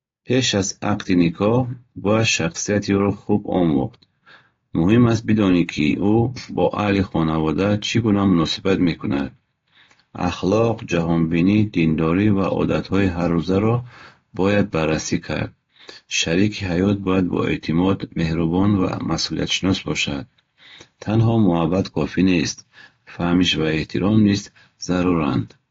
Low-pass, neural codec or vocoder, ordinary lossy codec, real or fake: 7.2 kHz; none; AAC, 32 kbps; real